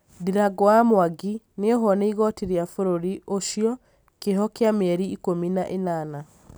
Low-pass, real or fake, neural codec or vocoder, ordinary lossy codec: none; real; none; none